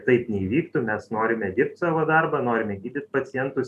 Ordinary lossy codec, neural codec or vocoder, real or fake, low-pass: MP3, 96 kbps; none; real; 14.4 kHz